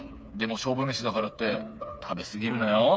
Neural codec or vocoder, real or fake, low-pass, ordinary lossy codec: codec, 16 kHz, 4 kbps, FreqCodec, smaller model; fake; none; none